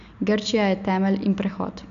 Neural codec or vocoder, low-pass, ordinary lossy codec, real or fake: none; 7.2 kHz; none; real